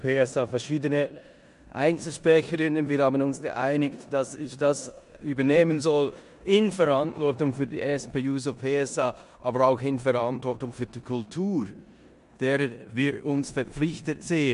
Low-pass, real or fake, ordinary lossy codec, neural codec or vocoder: 10.8 kHz; fake; MP3, 64 kbps; codec, 16 kHz in and 24 kHz out, 0.9 kbps, LongCat-Audio-Codec, four codebook decoder